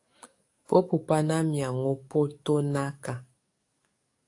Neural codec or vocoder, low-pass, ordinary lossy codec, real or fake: codec, 44.1 kHz, 7.8 kbps, DAC; 10.8 kHz; AAC, 48 kbps; fake